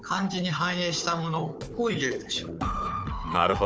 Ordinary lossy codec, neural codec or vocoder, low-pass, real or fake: none; codec, 16 kHz, 4 kbps, FunCodec, trained on Chinese and English, 50 frames a second; none; fake